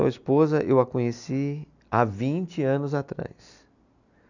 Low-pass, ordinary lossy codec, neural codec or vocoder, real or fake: 7.2 kHz; none; none; real